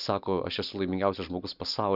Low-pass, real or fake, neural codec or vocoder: 5.4 kHz; real; none